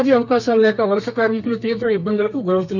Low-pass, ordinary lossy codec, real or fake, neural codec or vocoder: 7.2 kHz; none; fake; codec, 32 kHz, 1.9 kbps, SNAC